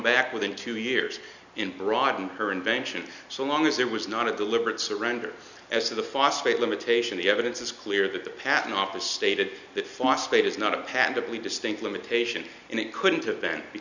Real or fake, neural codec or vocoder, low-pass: real; none; 7.2 kHz